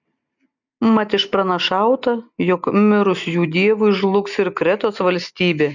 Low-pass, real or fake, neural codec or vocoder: 7.2 kHz; real; none